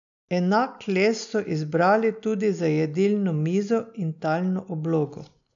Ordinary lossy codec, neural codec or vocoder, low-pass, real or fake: none; none; 7.2 kHz; real